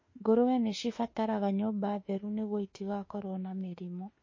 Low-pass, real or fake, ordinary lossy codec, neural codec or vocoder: 7.2 kHz; fake; MP3, 32 kbps; autoencoder, 48 kHz, 32 numbers a frame, DAC-VAE, trained on Japanese speech